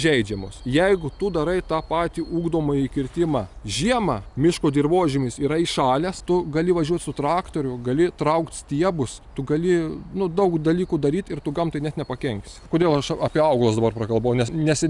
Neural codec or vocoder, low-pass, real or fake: none; 10.8 kHz; real